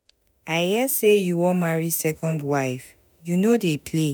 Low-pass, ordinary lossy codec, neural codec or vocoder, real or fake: none; none; autoencoder, 48 kHz, 32 numbers a frame, DAC-VAE, trained on Japanese speech; fake